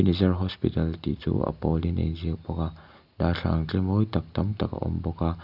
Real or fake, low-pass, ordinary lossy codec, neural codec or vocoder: real; 5.4 kHz; none; none